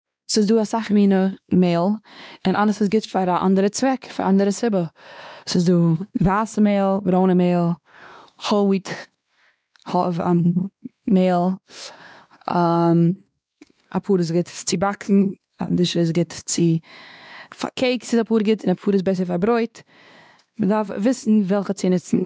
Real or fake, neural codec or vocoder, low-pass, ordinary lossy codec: fake; codec, 16 kHz, 2 kbps, X-Codec, WavLM features, trained on Multilingual LibriSpeech; none; none